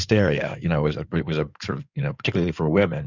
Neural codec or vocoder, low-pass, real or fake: codec, 16 kHz in and 24 kHz out, 2.2 kbps, FireRedTTS-2 codec; 7.2 kHz; fake